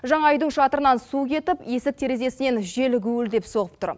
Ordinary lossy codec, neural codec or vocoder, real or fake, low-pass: none; none; real; none